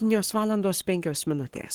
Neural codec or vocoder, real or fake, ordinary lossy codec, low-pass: codec, 44.1 kHz, 7.8 kbps, Pupu-Codec; fake; Opus, 16 kbps; 19.8 kHz